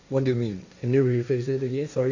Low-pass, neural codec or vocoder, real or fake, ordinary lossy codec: 7.2 kHz; codec, 16 kHz, 1.1 kbps, Voila-Tokenizer; fake; none